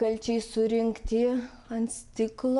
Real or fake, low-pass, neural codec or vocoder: real; 10.8 kHz; none